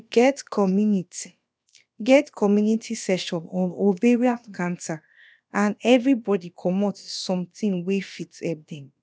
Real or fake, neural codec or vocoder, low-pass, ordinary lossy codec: fake; codec, 16 kHz, about 1 kbps, DyCAST, with the encoder's durations; none; none